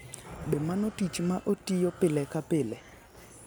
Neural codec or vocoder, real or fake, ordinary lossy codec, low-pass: none; real; none; none